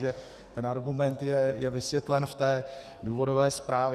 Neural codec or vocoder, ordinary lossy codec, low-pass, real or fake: codec, 44.1 kHz, 2.6 kbps, SNAC; Opus, 64 kbps; 14.4 kHz; fake